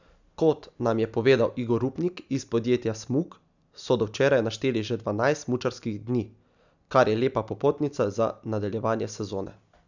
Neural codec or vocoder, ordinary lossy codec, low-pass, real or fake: none; none; 7.2 kHz; real